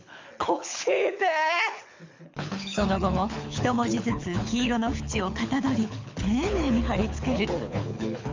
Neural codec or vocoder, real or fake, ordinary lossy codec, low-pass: codec, 24 kHz, 6 kbps, HILCodec; fake; MP3, 64 kbps; 7.2 kHz